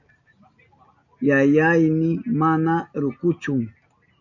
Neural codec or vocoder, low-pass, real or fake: none; 7.2 kHz; real